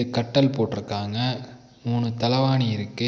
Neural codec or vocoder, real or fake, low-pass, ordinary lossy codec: none; real; none; none